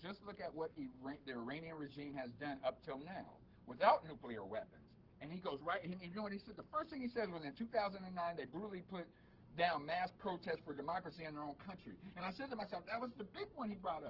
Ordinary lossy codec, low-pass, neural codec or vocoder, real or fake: Opus, 16 kbps; 5.4 kHz; codec, 44.1 kHz, 7.8 kbps, Pupu-Codec; fake